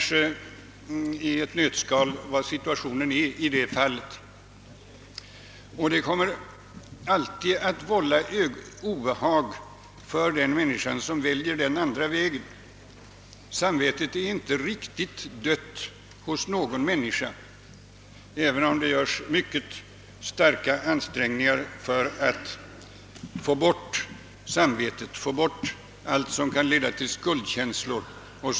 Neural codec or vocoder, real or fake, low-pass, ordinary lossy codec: none; real; none; none